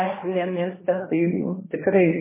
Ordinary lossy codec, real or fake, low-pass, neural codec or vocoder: MP3, 16 kbps; fake; 3.6 kHz; codec, 24 kHz, 1 kbps, SNAC